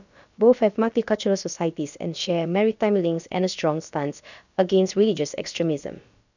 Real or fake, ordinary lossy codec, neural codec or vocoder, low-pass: fake; none; codec, 16 kHz, about 1 kbps, DyCAST, with the encoder's durations; 7.2 kHz